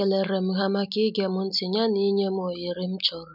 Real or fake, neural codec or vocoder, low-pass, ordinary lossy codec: real; none; 5.4 kHz; none